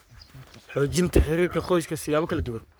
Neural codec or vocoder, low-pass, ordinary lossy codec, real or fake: codec, 44.1 kHz, 3.4 kbps, Pupu-Codec; none; none; fake